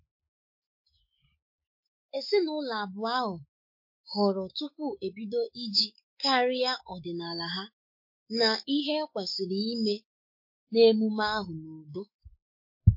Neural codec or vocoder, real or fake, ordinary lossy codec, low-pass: autoencoder, 48 kHz, 128 numbers a frame, DAC-VAE, trained on Japanese speech; fake; MP3, 32 kbps; 5.4 kHz